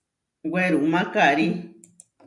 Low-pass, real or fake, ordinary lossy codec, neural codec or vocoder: 10.8 kHz; real; AAC, 64 kbps; none